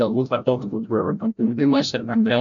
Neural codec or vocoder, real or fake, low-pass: codec, 16 kHz, 0.5 kbps, FreqCodec, larger model; fake; 7.2 kHz